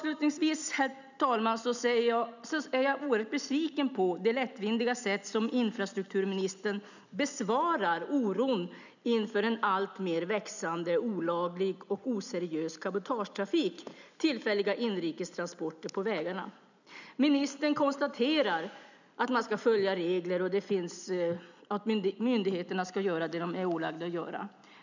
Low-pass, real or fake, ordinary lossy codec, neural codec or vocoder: 7.2 kHz; real; none; none